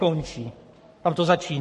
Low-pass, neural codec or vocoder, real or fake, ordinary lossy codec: 14.4 kHz; codec, 44.1 kHz, 7.8 kbps, Pupu-Codec; fake; MP3, 48 kbps